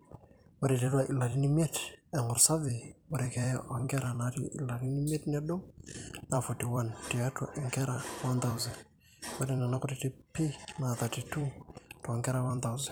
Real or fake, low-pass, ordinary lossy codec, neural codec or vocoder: fake; none; none; vocoder, 44.1 kHz, 128 mel bands every 256 samples, BigVGAN v2